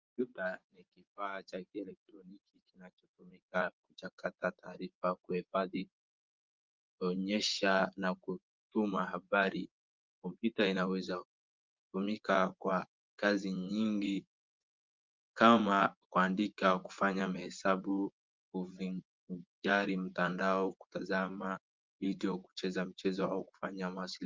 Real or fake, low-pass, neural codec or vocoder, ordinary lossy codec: fake; 7.2 kHz; vocoder, 24 kHz, 100 mel bands, Vocos; Opus, 32 kbps